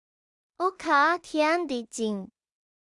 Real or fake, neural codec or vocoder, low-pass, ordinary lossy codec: fake; codec, 16 kHz in and 24 kHz out, 0.4 kbps, LongCat-Audio-Codec, two codebook decoder; 10.8 kHz; Opus, 64 kbps